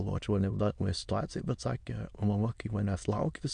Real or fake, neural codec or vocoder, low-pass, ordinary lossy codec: fake; autoencoder, 22.05 kHz, a latent of 192 numbers a frame, VITS, trained on many speakers; 9.9 kHz; MP3, 64 kbps